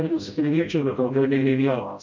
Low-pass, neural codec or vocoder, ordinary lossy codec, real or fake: 7.2 kHz; codec, 16 kHz, 0.5 kbps, FreqCodec, smaller model; MP3, 48 kbps; fake